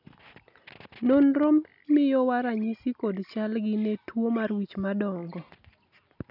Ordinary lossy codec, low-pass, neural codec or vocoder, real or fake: none; 5.4 kHz; none; real